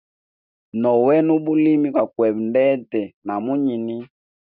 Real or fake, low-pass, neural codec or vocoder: real; 5.4 kHz; none